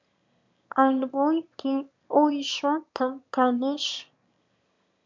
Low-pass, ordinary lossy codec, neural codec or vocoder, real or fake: 7.2 kHz; MP3, 64 kbps; autoencoder, 22.05 kHz, a latent of 192 numbers a frame, VITS, trained on one speaker; fake